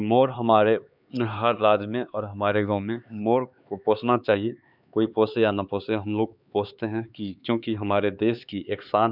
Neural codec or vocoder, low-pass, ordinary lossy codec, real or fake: codec, 16 kHz, 4 kbps, X-Codec, HuBERT features, trained on LibriSpeech; 5.4 kHz; none; fake